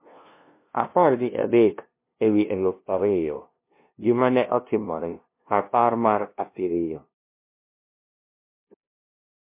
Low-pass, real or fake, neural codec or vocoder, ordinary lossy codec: 3.6 kHz; fake; codec, 16 kHz, 0.5 kbps, FunCodec, trained on LibriTTS, 25 frames a second; MP3, 24 kbps